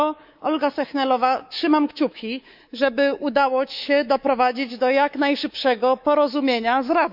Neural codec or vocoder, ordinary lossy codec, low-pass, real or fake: codec, 24 kHz, 3.1 kbps, DualCodec; none; 5.4 kHz; fake